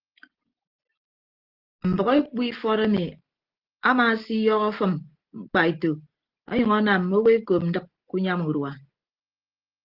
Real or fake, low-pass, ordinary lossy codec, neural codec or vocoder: real; 5.4 kHz; Opus, 16 kbps; none